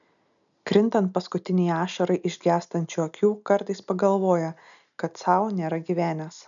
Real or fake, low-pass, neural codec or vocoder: real; 7.2 kHz; none